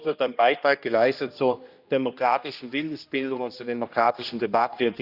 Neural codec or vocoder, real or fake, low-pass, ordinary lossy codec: codec, 16 kHz, 1 kbps, X-Codec, HuBERT features, trained on general audio; fake; 5.4 kHz; Opus, 64 kbps